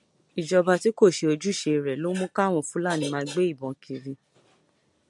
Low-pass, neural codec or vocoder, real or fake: 10.8 kHz; none; real